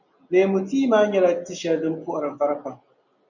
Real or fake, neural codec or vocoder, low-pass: real; none; 7.2 kHz